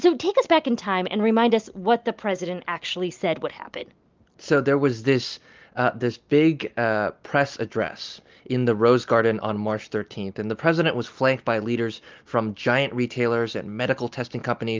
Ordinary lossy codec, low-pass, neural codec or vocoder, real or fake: Opus, 24 kbps; 7.2 kHz; none; real